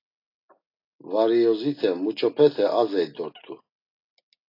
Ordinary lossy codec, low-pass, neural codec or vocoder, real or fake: AAC, 32 kbps; 5.4 kHz; none; real